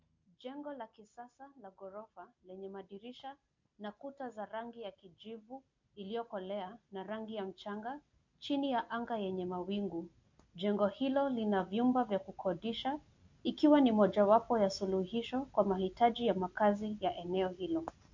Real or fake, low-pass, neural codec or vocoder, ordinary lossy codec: real; 7.2 kHz; none; MP3, 48 kbps